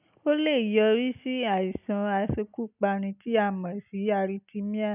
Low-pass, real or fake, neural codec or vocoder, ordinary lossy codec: 3.6 kHz; real; none; none